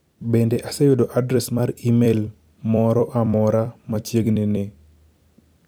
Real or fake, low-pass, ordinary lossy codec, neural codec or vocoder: fake; none; none; vocoder, 44.1 kHz, 128 mel bands every 256 samples, BigVGAN v2